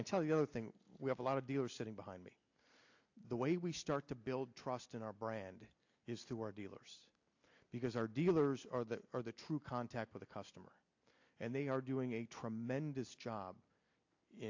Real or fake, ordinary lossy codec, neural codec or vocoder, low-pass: real; AAC, 48 kbps; none; 7.2 kHz